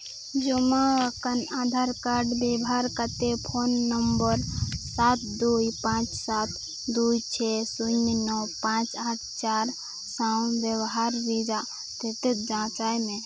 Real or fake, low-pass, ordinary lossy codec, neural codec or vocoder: real; none; none; none